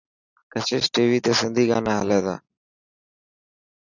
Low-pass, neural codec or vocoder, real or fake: 7.2 kHz; none; real